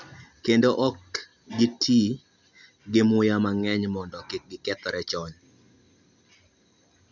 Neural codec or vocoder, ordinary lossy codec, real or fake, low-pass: none; none; real; 7.2 kHz